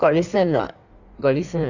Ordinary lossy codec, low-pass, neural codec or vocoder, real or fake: none; 7.2 kHz; codec, 16 kHz in and 24 kHz out, 1.1 kbps, FireRedTTS-2 codec; fake